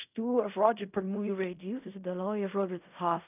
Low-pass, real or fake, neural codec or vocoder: 3.6 kHz; fake; codec, 16 kHz in and 24 kHz out, 0.4 kbps, LongCat-Audio-Codec, fine tuned four codebook decoder